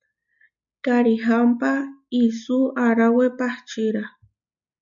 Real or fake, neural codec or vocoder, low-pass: real; none; 7.2 kHz